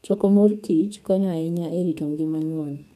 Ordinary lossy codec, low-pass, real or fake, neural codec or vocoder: none; 14.4 kHz; fake; codec, 32 kHz, 1.9 kbps, SNAC